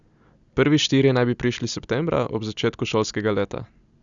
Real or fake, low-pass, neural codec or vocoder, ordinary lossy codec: real; 7.2 kHz; none; Opus, 64 kbps